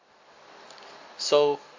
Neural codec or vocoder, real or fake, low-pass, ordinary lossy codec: none; real; 7.2 kHz; MP3, 48 kbps